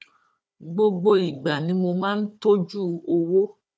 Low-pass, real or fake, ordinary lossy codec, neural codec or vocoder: none; fake; none; codec, 16 kHz, 4 kbps, FunCodec, trained on Chinese and English, 50 frames a second